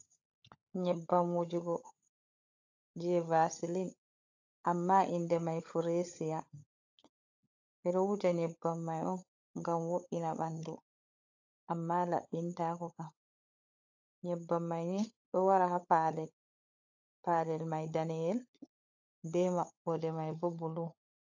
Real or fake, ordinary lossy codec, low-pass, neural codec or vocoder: fake; AAC, 48 kbps; 7.2 kHz; codec, 16 kHz, 16 kbps, FunCodec, trained on LibriTTS, 50 frames a second